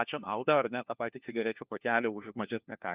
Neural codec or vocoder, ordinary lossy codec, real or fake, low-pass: codec, 16 kHz, 1 kbps, FunCodec, trained on LibriTTS, 50 frames a second; Opus, 24 kbps; fake; 3.6 kHz